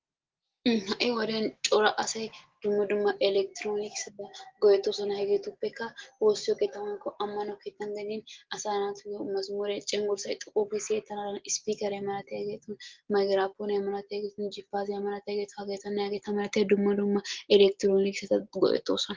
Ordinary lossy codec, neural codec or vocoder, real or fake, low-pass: Opus, 16 kbps; none; real; 7.2 kHz